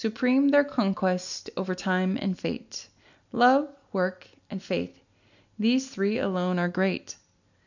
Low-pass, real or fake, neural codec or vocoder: 7.2 kHz; real; none